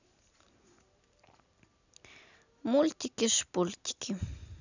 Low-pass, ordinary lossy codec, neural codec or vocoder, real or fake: 7.2 kHz; none; none; real